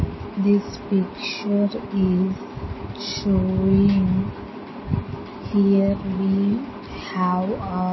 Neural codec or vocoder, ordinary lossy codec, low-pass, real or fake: none; MP3, 24 kbps; 7.2 kHz; real